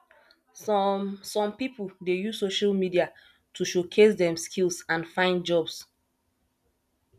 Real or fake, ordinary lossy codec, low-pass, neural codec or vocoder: real; none; 14.4 kHz; none